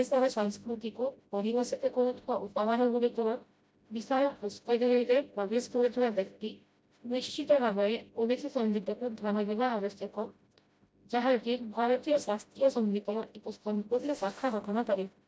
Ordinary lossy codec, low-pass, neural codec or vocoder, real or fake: none; none; codec, 16 kHz, 0.5 kbps, FreqCodec, smaller model; fake